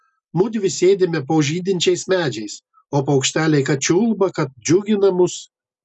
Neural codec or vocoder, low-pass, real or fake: none; 10.8 kHz; real